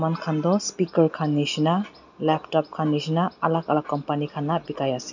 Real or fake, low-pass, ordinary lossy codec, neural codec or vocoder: real; 7.2 kHz; none; none